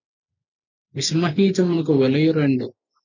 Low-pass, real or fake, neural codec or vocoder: 7.2 kHz; real; none